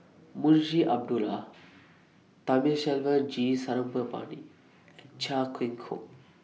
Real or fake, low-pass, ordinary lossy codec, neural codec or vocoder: real; none; none; none